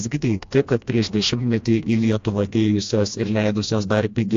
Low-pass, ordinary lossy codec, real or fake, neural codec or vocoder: 7.2 kHz; AAC, 48 kbps; fake; codec, 16 kHz, 1 kbps, FreqCodec, smaller model